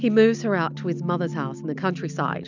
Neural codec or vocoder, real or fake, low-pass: none; real; 7.2 kHz